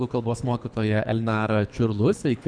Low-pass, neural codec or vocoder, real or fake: 9.9 kHz; codec, 24 kHz, 3 kbps, HILCodec; fake